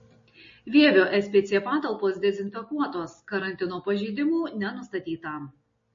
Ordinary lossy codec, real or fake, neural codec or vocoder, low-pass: MP3, 32 kbps; real; none; 7.2 kHz